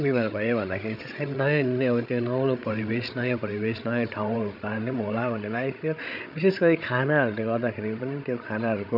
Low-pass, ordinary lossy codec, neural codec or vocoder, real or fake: 5.4 kHz; none; codec, 16 kHz, 16 kbps, FreqCodec, larger model; fake